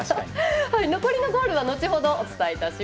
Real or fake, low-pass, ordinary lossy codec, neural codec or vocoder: real; none; none; none